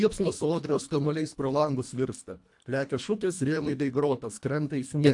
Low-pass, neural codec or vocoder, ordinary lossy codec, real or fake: 10.8 kHz; codec, 24 kHz, 1.5 kbps, HILCodec; AAC, 64 kbps; fake